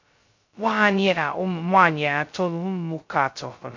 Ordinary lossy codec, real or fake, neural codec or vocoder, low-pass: MP3, 48 kbps; fake; codec, 16 kHz, 0.2 kbps, FocalCodec; 7.2 kHz